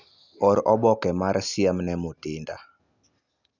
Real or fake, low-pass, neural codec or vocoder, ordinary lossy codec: real; 7.2 kHz; none; none